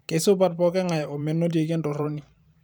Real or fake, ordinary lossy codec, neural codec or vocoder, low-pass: fake; none; vocoder, 44.1 kHz, 128 mel bands every 512 samples, BigVGAN v2; none